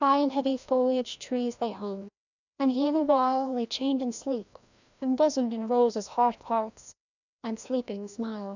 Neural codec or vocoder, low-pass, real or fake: codec, 16 kHz, 1 kbps, FreqCodec, larger model; 7.2 kHz; fake